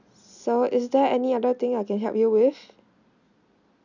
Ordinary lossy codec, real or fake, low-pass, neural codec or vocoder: none; real; 7.2 kHz; none